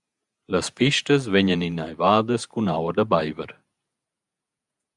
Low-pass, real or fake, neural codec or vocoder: 10.8 kHz; fake; vocoder, 48 kHz, 128 mel bands, Vocos